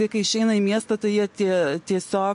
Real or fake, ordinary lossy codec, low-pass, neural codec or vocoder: real; MP3, 48 kbps; 10.8 kHz; none